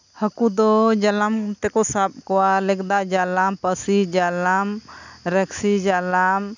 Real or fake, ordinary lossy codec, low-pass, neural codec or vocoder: real; none; 7.2 kHz; none